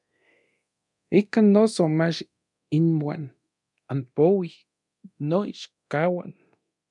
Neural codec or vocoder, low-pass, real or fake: codec, 24 kHz, 0.9 kbps, DualCodec; 10.8 kHz; fake